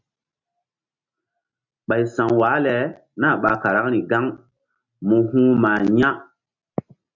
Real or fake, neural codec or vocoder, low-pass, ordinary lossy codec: real; none; 7.2 kHz; MP3, 64 kbps